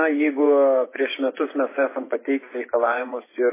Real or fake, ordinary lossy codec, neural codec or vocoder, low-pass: fake; MP3, 16 kbps; vocoder, 24 kHz, 100 mel bands, Vocos; 3.6 kHz